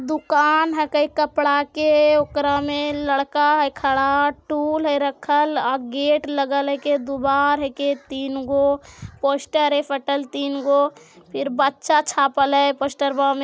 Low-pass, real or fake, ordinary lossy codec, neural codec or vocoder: none; real; none; none